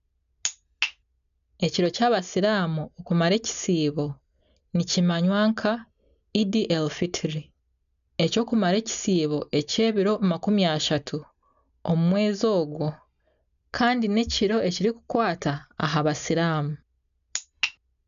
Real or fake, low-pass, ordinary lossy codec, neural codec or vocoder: real; 7.2 kHz; none; none